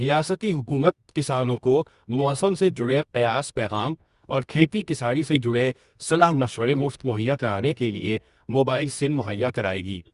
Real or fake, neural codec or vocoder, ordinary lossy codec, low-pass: fake; codec, 24 kHz, 0.9 kbps, WavTokenizer, medium music audio release; Opus, 64 kbps; 10.8 kHz